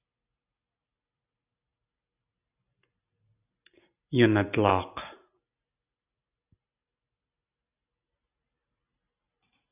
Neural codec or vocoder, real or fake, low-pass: none; real; 3.6 kHz